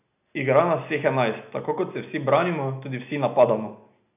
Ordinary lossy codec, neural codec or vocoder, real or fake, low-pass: none; none; real; 3.6 kHz